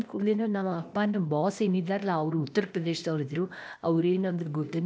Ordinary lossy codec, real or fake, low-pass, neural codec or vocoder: none; fake; none; codec, 16 kHz, 0.8 kbps, ZipCodec